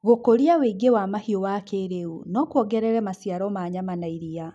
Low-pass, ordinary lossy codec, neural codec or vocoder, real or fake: none; none; none; real